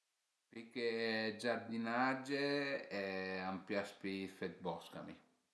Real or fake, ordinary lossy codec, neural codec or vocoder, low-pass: real; none; none; none